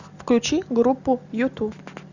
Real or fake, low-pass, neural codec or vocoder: real; 7.2 kHz; none